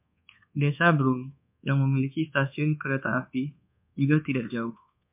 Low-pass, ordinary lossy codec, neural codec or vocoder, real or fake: 3.6 kHz; MP3, 32 kbps; codec, 24 kHz, 1.2 kbps, DualCodec; fake